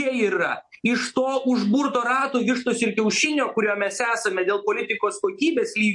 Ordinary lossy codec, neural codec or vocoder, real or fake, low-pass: MP3, 48 kbps; none; real; 10.8 kHz